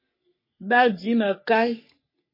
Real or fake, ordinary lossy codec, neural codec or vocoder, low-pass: fake; MP3, 24 kbps; codec, 44.1 kHz, 3.4 kbps, Pupu-Codec; 5.4 kHz